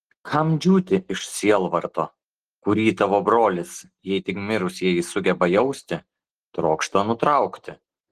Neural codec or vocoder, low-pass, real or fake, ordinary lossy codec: none; 14.4 kHz; real; Opus, 16 kbps